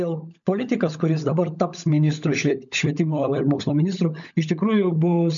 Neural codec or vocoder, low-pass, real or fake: codec, 16 kHz, 16 kbps, FunCodec, trained on LibriTTS, 50 frames a second; 7.2 kHz; fake